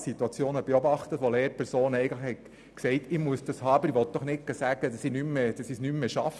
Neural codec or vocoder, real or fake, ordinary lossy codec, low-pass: none; real; none; none